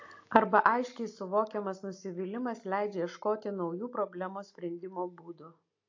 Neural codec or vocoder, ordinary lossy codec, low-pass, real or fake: none; AAC, 48 kbps; 7.2 kHz; real